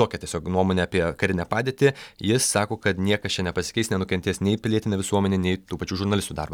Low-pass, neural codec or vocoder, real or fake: 19.8 kHz; none; real